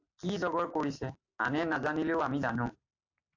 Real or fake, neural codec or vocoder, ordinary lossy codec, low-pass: real; none; AAC, 48 kbps; 7.2 kHz